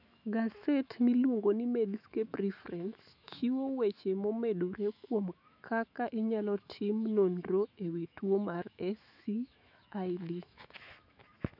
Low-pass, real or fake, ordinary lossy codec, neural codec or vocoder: 5.4 kHz; fake; none; autoencoder, 48 kHz, 128 numbers a frame, DAC-VAE, trained on Japanese speech